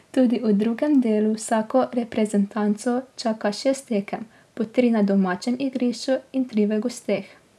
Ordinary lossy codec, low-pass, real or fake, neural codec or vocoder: none; none; real; none